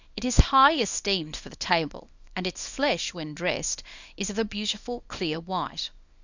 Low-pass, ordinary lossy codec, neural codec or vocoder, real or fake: 7.2 kHz; Opus, 64 kbps; codec, 24 kHz, 0.9 kbps, WavTokenizer, small release; fake